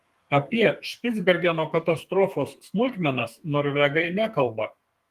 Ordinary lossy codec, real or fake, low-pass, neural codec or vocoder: Opus, 24 kbps; fake; 14.4 kHz; codec, 32 kHz, 1.9 kbps, SNAC